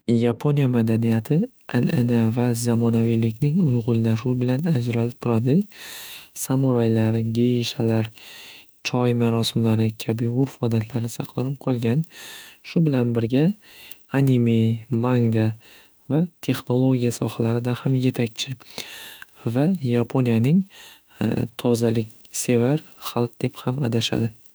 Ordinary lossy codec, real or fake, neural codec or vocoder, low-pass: none; fake; autoencoder, 48 kHz, 32 numbers a frame, DAC-VAE, trained on Japanese speech; none